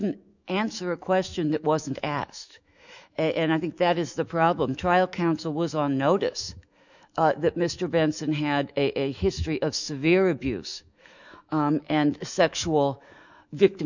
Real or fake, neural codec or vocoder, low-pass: fake; autoencoder, 48 kHz, 128 numbers a frame, DAC-VAE, trained on Japanese speech; 7.2 kHz